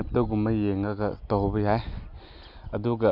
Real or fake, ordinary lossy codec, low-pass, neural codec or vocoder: real; none; 5.4 kHz; none